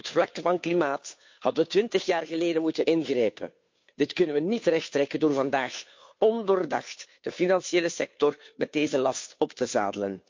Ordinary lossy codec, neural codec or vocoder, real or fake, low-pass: MP3, 48 kbps; codec, 16 kHz, 2 kbps, FunCodec, trained on Chinese and English, 25 frames a second; fake; 7.2 kHz